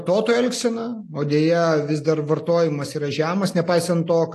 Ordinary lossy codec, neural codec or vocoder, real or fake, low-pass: AAC, 48 kbps; none; real; 14.4 kHz